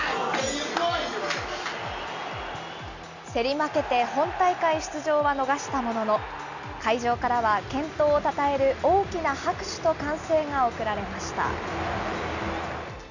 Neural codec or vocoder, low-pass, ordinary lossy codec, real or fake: none; 7.2 kHz; none; real